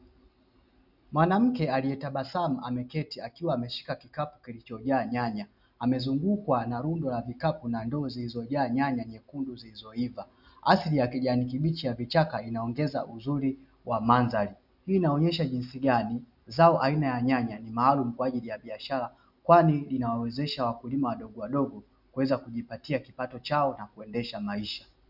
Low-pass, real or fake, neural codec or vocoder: 5.4 kHz; real; none